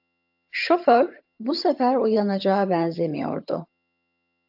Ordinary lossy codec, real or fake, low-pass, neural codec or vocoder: AAC, 48 kbps; fake; 5.4 kHz; vocoder, 22.05 kHz, 80 mel bands, HiFi-GAN